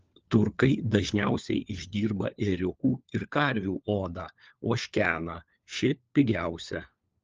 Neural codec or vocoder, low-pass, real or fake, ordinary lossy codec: codec, 16 kHz, 4 kbps, FunCodec, trained on LibriTTS, 50 frames a second; 7.2 kHz; fake; Opus, 24 kbps